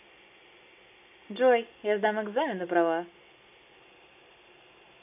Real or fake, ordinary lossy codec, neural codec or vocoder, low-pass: real; none; none; 3.6 kHz